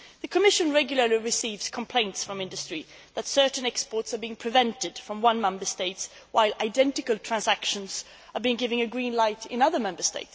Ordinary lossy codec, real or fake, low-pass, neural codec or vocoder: none; real; none; none